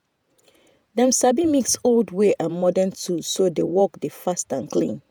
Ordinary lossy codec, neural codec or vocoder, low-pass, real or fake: none; vocoder, 48 kHz, 128 mel bands, Vocos; none; fake